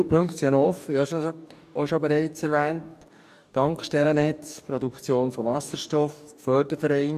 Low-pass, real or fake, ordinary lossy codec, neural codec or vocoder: 14.4 kHz; fake; none; codec, 44.1 kHz, 2.6 kbps, DAC